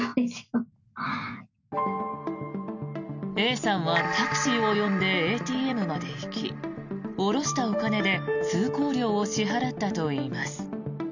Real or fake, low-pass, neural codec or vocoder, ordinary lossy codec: real; 7.2 kHz; none; none